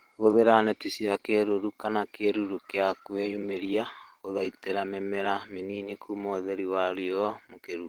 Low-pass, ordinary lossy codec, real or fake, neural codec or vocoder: 19.8 kHz; Opus, 16 kbps; fake; vocoder, 44.1 kHz, 128 mel bands every 512 samples, BigVGAN v2